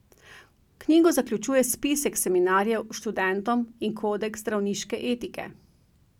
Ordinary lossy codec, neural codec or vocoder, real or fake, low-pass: none; none; real; 19.8 kHz